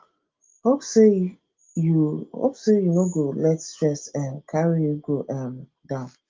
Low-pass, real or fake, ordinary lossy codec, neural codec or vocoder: 7.2 kHz; real; Opus, 32 kbps; none